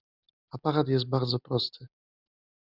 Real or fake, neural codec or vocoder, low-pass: real; none; 5.4 kHz